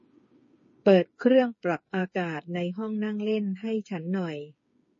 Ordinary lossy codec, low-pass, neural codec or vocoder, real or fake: MP3, 32 kbps; 7.2 kHz; codec, 16 kHz, 8 kbps, FreqCodec, smaller model; fake